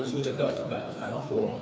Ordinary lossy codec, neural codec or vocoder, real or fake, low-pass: none; codec, 16 kHz, 2 kbps, FreqCodec, larger model; fake; none